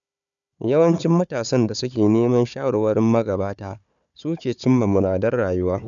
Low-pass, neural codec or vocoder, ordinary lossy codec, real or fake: 7.2 kHz; codec, 16 kHz, 4 kbps, FunCodec, trained on Chinese and English, 50 frames a second; none; fake